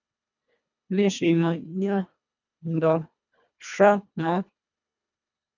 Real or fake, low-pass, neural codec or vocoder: fake; 7.2 kHz; codec, 24 kHz, 1.5 kbps, HILCodec